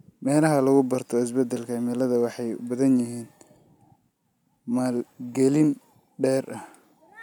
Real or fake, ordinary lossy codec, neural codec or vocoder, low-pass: real; none; none; 19.8 kHz